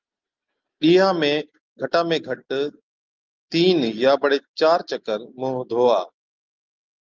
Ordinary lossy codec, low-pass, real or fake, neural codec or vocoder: Opus, 32 kbps; 7.2 kHz; real; none